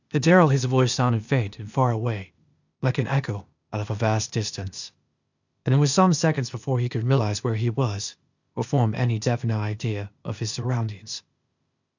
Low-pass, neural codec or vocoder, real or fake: 7.2 kHz; codec, 16 kHz, 0.8 kbps, ZipCodec; fake